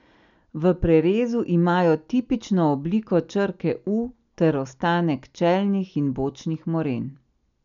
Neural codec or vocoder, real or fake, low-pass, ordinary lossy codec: none; real; 7.2 kHz; none